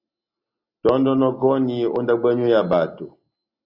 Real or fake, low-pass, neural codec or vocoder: real; 5.4 kHz; none